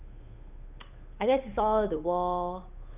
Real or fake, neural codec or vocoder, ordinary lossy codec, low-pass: fake; codec, 16 kHz, 8 kbps, FunCodec, trained on Chinese and English, 25 frames a second; none; 3.6 kHz